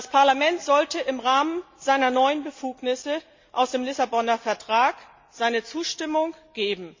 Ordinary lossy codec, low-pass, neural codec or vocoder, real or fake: MP3, 64 kbps; 7.2 kHz; none; real